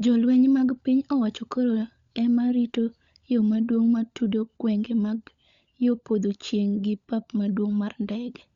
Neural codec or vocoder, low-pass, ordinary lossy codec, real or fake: codec, 16 kHz, 16 kbps, FunCodec, trained on LibriTTS, 50 frames a second; 7.2 kHz; Opus, 64 kbps; fake